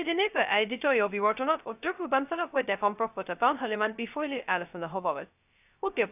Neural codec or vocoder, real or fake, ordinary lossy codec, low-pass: codec, 16 kHz, 0.2 kbps, FocalCodec; fake; none; 3.6 kHz